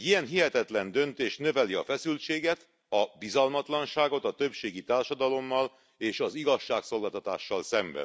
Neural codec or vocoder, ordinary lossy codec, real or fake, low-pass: none; none; real; none